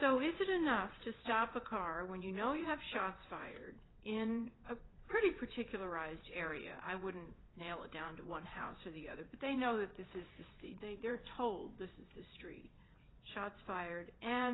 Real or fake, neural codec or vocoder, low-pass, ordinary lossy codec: fake; vocoder, 22.05 kHz, 80 mel bands, WaveNeXt; 7.2 kHz; AAC, 16 kbps